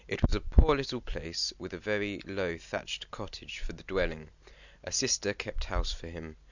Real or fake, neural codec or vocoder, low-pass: real; none; 7.2 kHz